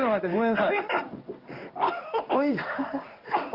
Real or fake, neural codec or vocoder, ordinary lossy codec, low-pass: fake; codec, 16 kHz in and 24 kHz out, 1 kbps, XY-Tokenizer; Opus, 32 kbps; 5.4 kHz